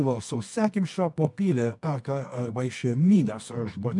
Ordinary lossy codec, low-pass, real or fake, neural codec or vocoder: MP3, 64 kbps; 10.8 kHz; fake; codec, 24 kHz, 0.9 kbps, WavTokenizer, medium music audio release